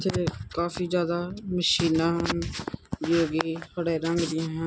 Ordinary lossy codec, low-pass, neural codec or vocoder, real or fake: none; none; none; real